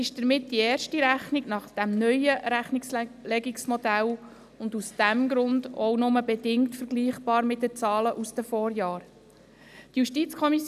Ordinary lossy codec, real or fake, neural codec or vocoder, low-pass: none; real; none; 14.4 kHz